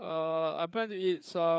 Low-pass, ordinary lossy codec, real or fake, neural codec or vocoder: none; none; fake; codec, 16 kHz, 4 kbps, FreqCodec, larger model